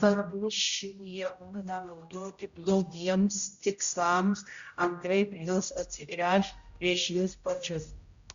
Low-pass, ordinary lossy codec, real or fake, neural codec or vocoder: 7.2 kHz; Opus, 64 kbps; fake; codec, 16 kHz, 0.5 kbps, X-Codec, HuBERT features, trained on general audio